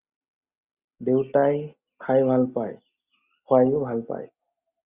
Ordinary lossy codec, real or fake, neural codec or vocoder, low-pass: Opus, 64 kbps; real; none; 3.6 kHz